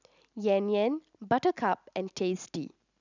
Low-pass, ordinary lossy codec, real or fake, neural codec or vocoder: 7.2 kHz; none; real; none